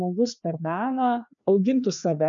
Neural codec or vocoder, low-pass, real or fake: codec, 16 kHz, 2 kbps, FreqCodec, larger model; 7.2 kHz; fake